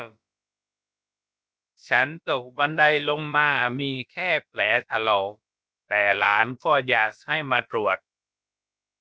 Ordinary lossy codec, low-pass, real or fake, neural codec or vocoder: none; none; fake; codec, 16 kHz, about 1 kbps, DyCAST, with the encoder's durations